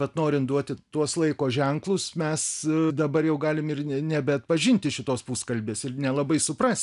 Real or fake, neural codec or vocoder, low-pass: real; none; 10.8 kHz